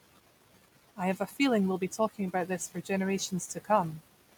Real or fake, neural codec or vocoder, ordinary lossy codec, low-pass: real; none; none; none